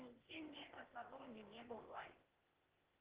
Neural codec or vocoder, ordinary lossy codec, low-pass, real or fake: codec, 16 kHz, 0.8 kbps, ZipCodec; Opus, 16 kbps; 3.6 kHz; fake